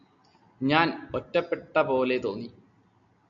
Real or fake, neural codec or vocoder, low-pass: real; none; 7.2 kHz